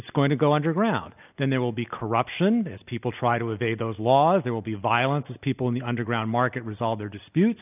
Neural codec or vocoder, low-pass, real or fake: none; 3.6 kHz; real